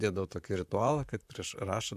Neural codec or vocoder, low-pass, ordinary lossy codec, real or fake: vocoder, 44.1 kHz, 128 mel bands, Pupu-Vocoder; 14.4 kHz; AAC, 96 kbps; fake